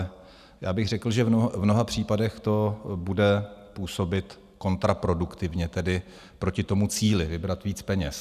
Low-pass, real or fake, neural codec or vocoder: 14.4 kHz; real; none